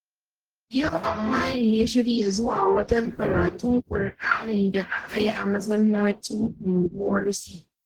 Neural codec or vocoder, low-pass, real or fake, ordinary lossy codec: codec, 44.1 kHz, 0.9 kbps, DAC; 19.8 kHz; fake; Opus, 16 kbps